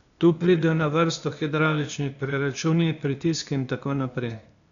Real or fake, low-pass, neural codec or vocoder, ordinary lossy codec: fake; 7.2 kHz; codec, 16 kHz, 0.8 kbps, ZipCodec; none